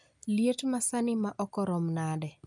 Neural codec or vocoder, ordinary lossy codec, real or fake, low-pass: none; none; real; 10.8 kHz